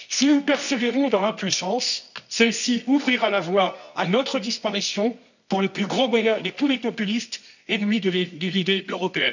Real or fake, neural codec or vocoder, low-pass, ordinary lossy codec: fake; codec, 24 kHz, 0.9 kbps, WavTokenizer, medium music audio release; 7.2 kHz; none